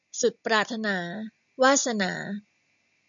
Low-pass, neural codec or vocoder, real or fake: 7.2 kHz; none; real